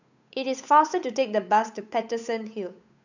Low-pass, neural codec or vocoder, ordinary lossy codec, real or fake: 7.2 kHz; codec, 16 kHz, 8 kbps, FunCodec, trained on Chinese and English, 25 frames a second; none; fake